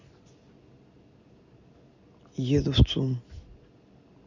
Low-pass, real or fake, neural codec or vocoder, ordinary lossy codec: 7.2 kHz; real; none; Opus, 64 kbps